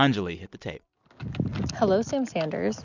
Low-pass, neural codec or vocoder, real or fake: 7.2 kHz; none; real